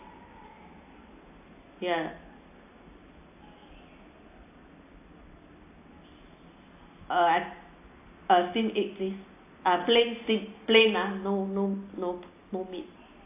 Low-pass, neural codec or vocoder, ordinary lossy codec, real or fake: 3.6 kHz; none; AAC, 24 kbps; real